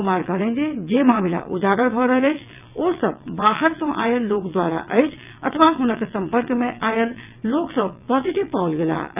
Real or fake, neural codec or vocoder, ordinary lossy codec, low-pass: fake; vocoder, 22.05 kHz, 80 mel bands, WaveNeXt; none; 3.6 kHz